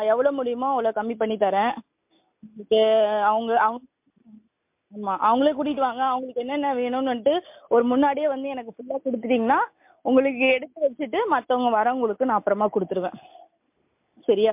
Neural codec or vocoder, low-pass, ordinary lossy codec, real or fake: none; 3.6 kHz; MP3, 32 kbps; real